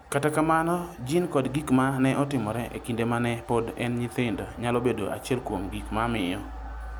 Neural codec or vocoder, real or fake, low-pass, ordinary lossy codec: none; real; none; none